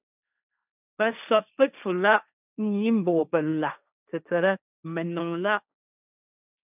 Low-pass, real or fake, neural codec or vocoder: 3.6 kHz; fake; codec, 16 kHz, 1.1 kbps, Voila-Tokenizer